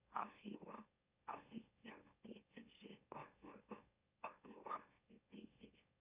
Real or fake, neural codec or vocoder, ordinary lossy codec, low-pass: fake; autoencoder, 44.1 kHz, a latent of 192 numbers a frame, MeloTTS; Opus, 64 kbps; 3.6 kHz